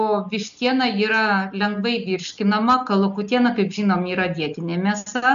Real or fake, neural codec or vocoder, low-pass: real; none; 7.2 kHz